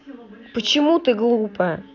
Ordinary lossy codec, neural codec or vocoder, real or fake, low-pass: none; none; real; 7.2 kHz